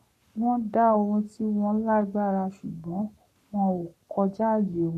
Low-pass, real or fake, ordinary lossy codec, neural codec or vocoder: 14.4 kHz; fake; none; codec, 44.1 kHz, 7.8 kbps, Pupu-Codec